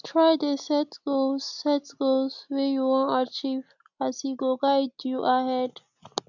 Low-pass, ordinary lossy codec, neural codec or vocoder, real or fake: 7.2 kHz; none; none; real